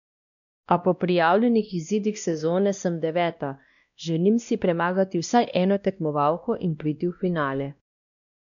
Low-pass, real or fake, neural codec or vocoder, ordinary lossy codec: 7.2 kHz; fake; codec, 16 kHz, 1 kbps, X-Codec, WavLM features, trained on Multilingual LibriSpeech; none